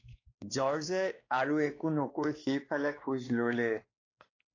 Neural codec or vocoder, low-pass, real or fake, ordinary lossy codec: codec, 16 kHz, 2 kbps, X-Codec, WavLM features, trained on Multilingual LibriSpeech; 7.2 kHz; fake; AAC, 32 kbps